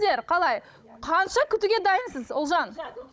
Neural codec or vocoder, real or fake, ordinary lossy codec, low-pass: codec, 16 kHz, 16 kbps, FunCodec, trained on Chinese and English, 50 frames a second; fake; none; none